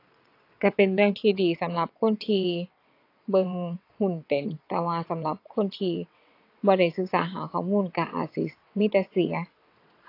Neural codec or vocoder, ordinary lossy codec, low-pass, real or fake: vocoder, 22.05 kHz, 80 mel bands, WaveNeXt; AAC, 32 kbps; 5.4 kHz; fake